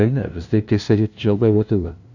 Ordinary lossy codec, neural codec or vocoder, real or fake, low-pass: none; codec, 16 kHz, 0.5 kbps, FunCodec, trained on LibriTTS, 25 frames a second; fake; 7.2 kHz